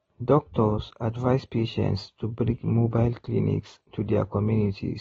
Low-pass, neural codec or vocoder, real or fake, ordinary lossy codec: 19.8 kHz; none; real; AAC, 24 kbps